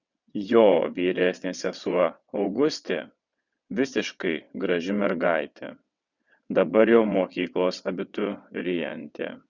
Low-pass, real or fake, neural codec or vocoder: 7.2 kHz; fake; vocoder, 22.05 kHz, 80 mel bands, WaveNeXt